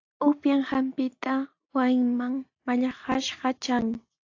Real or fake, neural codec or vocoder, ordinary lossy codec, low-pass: real; none; AAC, 48 kbps; 7.2 kHz